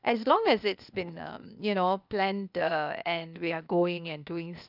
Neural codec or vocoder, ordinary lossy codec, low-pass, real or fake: codec, 16 kHz, 0.8 kbps, ZipCodec; none; 5.4 kHz; fake